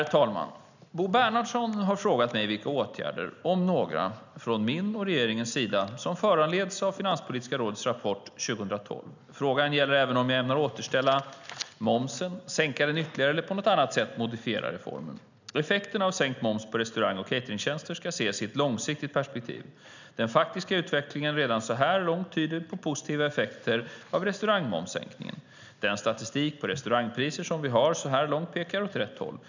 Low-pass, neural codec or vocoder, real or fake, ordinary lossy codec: 7.2 kHz; none; real; none